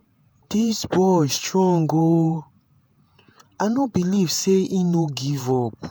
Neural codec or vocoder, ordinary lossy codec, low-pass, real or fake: vocoder, 48 kHz, 128 mel bands, Vocos; none; none; fake